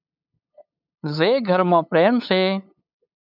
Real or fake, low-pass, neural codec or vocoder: fake; 5.4 kHz; codec, 16 kHz, 8 kbps, FunCodec, trained on LibriTTS, 25 frames a second